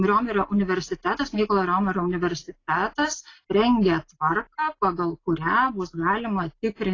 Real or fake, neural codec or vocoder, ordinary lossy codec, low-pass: real; none; AAC, 32 kbps; 7.2 kHz